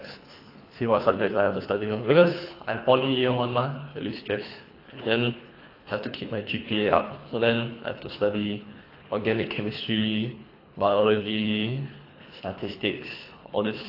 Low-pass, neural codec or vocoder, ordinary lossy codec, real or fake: 5.4 kHz; codec, 24 kHz, 3 kbps, HILCodec; AAC, 32 kbps; fake